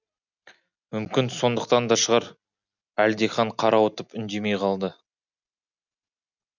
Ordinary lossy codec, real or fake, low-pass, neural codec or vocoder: none; real; 7.2 kHz; none